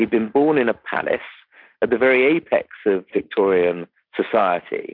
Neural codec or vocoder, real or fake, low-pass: none; real; 5.4 kHz